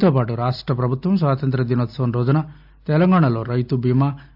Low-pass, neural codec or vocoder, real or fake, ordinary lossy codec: 5.4 kHz; none; real; AAC, 48 kbps